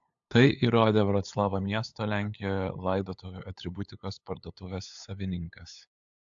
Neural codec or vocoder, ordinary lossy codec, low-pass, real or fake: codec, 16 kHz, 8 kbps, FunCodec, trained on LibriTTS, 25 frames a second; AAC, 64 kbps; 7.2 kHz; fake